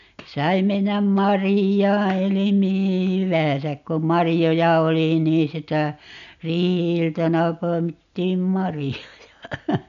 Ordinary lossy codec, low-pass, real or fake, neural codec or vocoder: none; 7.2 kHz; real; none